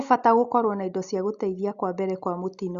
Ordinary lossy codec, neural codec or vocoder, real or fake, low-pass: Opus, 64 kbps; none; real; 7.2 kHz